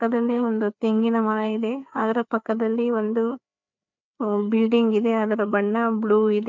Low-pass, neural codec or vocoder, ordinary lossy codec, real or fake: 7.2 kHz; codec, 16 kHz, 4 kbps, FreqCodec, larger model; MP3, 64 kbps; fake